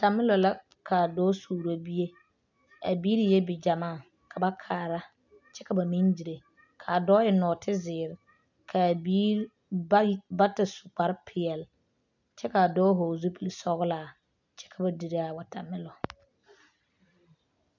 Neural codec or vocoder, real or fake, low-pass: none; real; 7.2 kHz